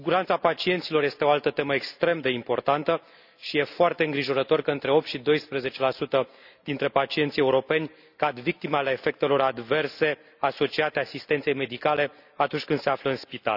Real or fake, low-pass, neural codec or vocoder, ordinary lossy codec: real; 5.4 kHz; none; none